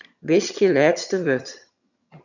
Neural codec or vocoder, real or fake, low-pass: vocoder, 22.05 kHz, 80 mel bands, HiFi-GAN; fake; 7.2 kHz